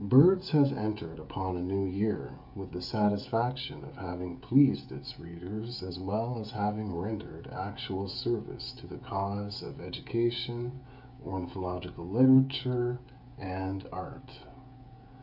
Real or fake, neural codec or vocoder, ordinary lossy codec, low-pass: fake; codec, 16 kHz, 16 kbps, FreqCodec, smaller model; MP3, 48 kbps; 5.4 kHz